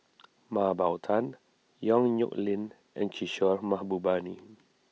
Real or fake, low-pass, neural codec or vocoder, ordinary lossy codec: real; none; none; none